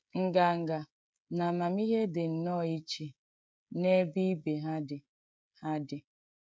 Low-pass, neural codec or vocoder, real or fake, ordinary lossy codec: none; codec, 16 kHz, 16 kbps, FreqCodec, smaller model; fake; none